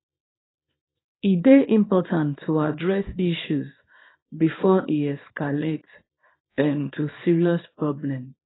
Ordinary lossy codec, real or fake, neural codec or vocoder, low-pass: AAC, 16 kbps; fake; codec, 24 kHz, 0.9 kbps, WavTokenizer, small release; 7.2 kHz